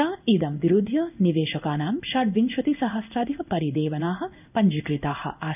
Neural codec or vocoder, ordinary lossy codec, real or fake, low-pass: codec, 16 kHz in and 24 kHz out, 1 kbps, XY-Tokenizer; none; fake; 3.6 kHz